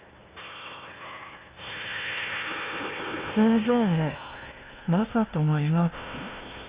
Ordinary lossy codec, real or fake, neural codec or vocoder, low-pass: Opus, 24 kbps; fake; codec, 16 kHz, 1 kbps, FunCodec, trained on Chinese and English, 50 frames a second; 3.6 kHz